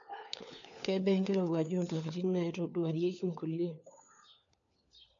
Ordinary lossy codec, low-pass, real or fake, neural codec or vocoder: none; 7.2 kHz; fake; codec, 16 kHz, 4 kbps, FunCodec, trained on LibriTTS, 50 frames a second